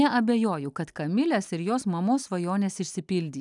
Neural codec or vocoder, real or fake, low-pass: none; real; 10.8 kHz